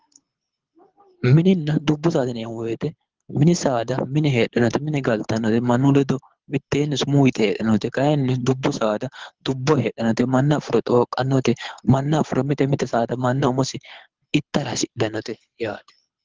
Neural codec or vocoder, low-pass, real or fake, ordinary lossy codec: codec, 24 kHz, 6 kbps, HILCodec; 7.2 kHz; fake; Opus, 16 kbps